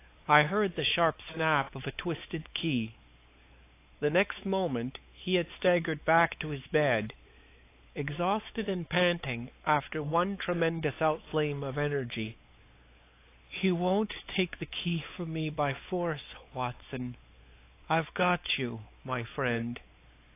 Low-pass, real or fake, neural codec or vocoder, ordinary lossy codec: 3.6 kHz; fake; codec, 16 kHz, 4 kbps, X-Codec, WavLM features, trained on Multilingual LibriSpeech; AAC, 24 kbps